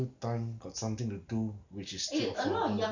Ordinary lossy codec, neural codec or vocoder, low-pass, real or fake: none; none; 7.2 kHz; real